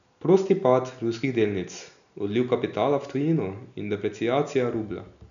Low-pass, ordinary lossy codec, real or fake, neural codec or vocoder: 7.2 kHz; none; real; none